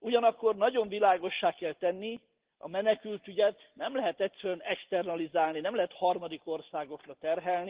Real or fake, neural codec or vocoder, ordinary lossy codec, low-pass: real; none; Opus, 16 kbps; 3.6 kHz